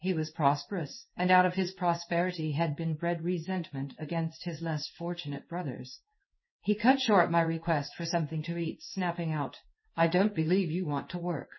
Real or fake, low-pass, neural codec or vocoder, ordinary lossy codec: fake; 7.2 kHz; codec, 16 kHz in and 24 kHz out, 1 kbps, XY-Tokenizer; MP3, 24 kbps